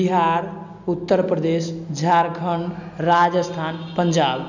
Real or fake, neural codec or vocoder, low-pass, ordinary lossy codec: real; none; 7.2 kHz; none